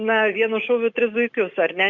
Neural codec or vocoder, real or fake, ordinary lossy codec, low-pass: none; real; Opus, 64 kbps; 7.2 kHz